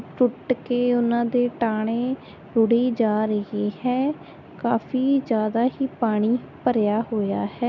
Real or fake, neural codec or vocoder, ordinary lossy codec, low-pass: real; none; none; 7.2 kHz